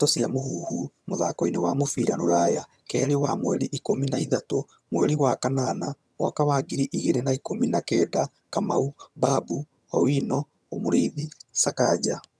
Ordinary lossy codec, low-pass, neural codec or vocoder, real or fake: none; none; vocoder, 22.05 kHz, 80 mel bands, HiFi-GAN; fake